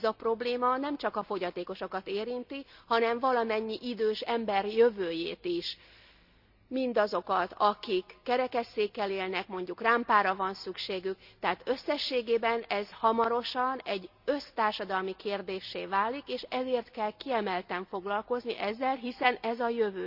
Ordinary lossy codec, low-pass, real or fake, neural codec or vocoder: none; 5.4 kHz; real; none